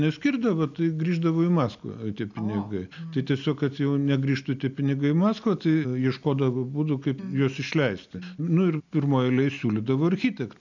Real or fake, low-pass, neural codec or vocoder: real; 7.2 kHz; none